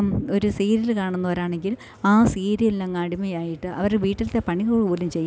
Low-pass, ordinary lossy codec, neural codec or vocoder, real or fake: none; none; none; real